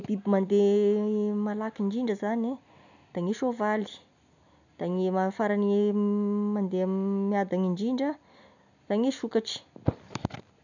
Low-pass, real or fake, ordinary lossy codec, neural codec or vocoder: 7.2 kHz; real; none; none